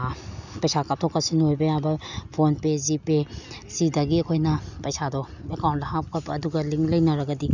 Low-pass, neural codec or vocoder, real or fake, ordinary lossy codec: 7.2 kHz; none; real; none